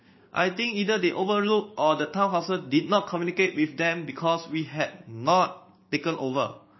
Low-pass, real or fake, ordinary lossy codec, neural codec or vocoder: 7.2 kHz; fake; MP3, 24 kbps; codec, 16 kHz, 6 kbps, DAC